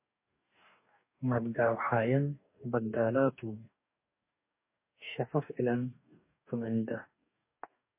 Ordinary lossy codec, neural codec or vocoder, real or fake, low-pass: MP3, 24 kbps; codec, 44.1 kHz, 2.6 kbps, DAC; fake; 3.6 kHz